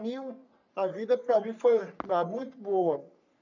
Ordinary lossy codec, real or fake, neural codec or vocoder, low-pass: none; fake; codec, 44.1 kHz, 3.4 kbps, Pupu-Codec; 7.2 kHz